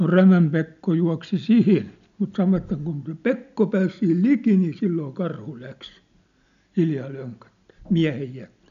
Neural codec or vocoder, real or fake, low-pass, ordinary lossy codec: none; real; 7.2 kHz; none